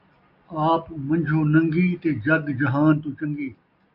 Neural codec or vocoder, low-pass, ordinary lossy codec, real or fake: none; 5.4 kHz; AAC, 48 kbps; real